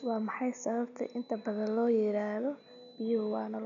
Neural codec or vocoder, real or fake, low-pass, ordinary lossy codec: none; real; 7.2 kHz; none